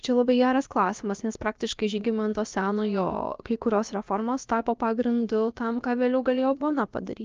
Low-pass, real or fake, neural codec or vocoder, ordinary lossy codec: 7.2 kHz; fake; codec, 16 kHz, about 1 kbps, DyCAST, with the encoder's durations; Opus, 24 kbps